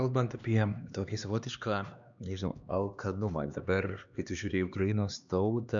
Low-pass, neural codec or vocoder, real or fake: 7.2 kHz; codec, 16 kHz, 2 kbps, X-Codec, HuBERT features, trained on LibriSpeech; fake